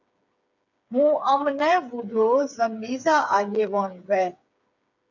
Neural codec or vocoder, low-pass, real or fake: codec, 16 kHz, 8 kbps, FreqCodec, smaller model; 7.2 kHz; fake